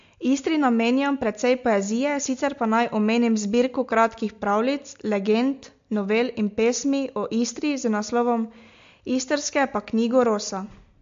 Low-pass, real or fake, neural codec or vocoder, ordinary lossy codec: 7.2 kHz; real; none; MP3, 48 kbps